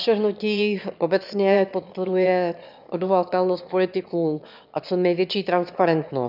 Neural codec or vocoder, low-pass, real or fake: autoencoder, 22.05 kHz, a latent of 192 numbers a frame, VITS, trained on one speaker; 5.4 kHz; fake